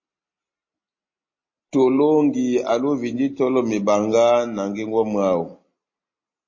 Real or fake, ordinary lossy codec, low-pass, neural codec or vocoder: real; MP3, 32 kbps; 7.2 kHz; none